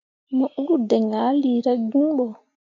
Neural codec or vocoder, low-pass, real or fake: none; 7.2 kHz; real